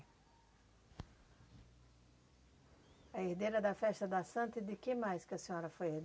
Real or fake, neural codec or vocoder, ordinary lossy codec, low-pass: real; none; none; none